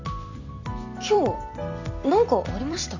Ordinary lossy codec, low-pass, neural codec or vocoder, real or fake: Opus, 64 kbps; 7.2 kHz; vocoder, 44.1 kHz, 128 mel bands every 256 samples, BigVGAN v2; fake